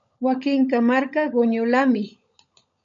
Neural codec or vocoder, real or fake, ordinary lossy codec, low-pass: codec, 16 kHz, 16 kbps, FunCodec, trained on LibriTTS, 50 frames a second; fake; MP3, 48 kbps; 7.2 kHz